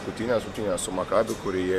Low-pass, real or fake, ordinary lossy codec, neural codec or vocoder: 14.4 kHz; fake; AAC, 96 kbps; vocoder, 44.1 kHz, 128 mel bands every 512 samples, BigVGAN v2